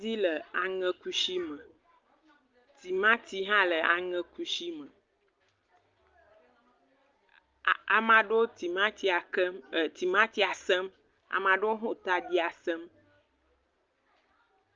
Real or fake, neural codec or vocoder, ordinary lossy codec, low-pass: real; none; Opus, 24 kbps; 7.2 kHz